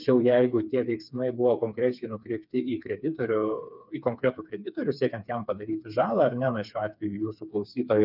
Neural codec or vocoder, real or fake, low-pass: codec, 16 kHz, 4 kbps, FreqCodec, smaller model; fake; 5.4 kHz